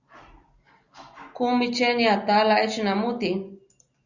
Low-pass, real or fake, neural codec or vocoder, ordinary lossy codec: 7.2 kHz; real; none; Opus, 64 kbps